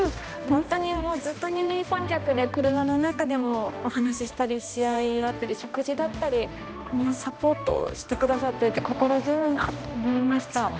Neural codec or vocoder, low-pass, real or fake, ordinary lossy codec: codec, 16 kHz, 1 kbps, X-Codec, HuBERT features, trained on balanced general audio; none; fake; none